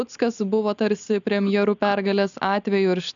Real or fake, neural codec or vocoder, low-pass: real; none; 7.2 kHz